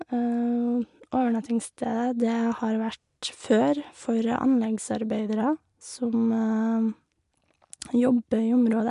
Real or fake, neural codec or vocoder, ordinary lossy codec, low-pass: real; none; MP3, 64 kbps; 10.8 kHz